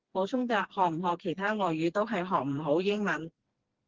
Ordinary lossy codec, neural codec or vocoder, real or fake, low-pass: Opus, 16 kbps; codec, 16 kHz, 2 kbps, FreqCodec, smaller model; fake; 7.2 kHz